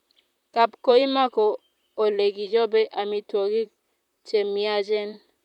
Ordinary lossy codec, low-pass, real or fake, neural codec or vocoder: none; 19.8 kHz; fake; vocoder, 44.1 kHz, 128 mel bands, Pupu-Vocoder